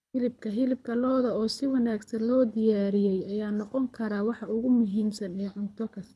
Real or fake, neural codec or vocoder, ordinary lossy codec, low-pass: fake; codec, 24 kHz, 6 kbps, HILCodec; none; none